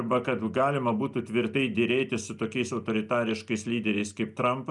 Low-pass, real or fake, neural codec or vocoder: 10.8 kHz; real; none